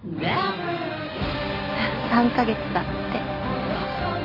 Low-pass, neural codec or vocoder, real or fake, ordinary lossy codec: 5.4 kHz; none; real; none